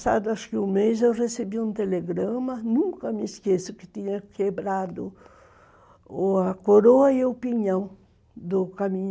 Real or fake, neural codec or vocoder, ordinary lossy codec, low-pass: real; none; none; none